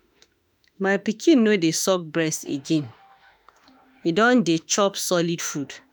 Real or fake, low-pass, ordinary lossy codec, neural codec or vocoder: fake; none; none; autoencoder, 48 kHz, 32 numbers a frame, DAC-VAE, trained on Japanese speech